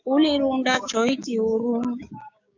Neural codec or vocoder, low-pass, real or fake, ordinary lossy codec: codec, 16 kHz, 6 kbps, DAC; 7.2 kHz; fake; AAC, 48 kbps